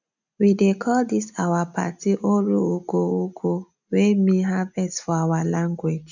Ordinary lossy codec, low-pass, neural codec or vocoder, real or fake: none; 7.2 kHz; none; real